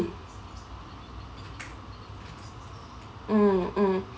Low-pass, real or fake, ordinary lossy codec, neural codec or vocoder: none; real; none; none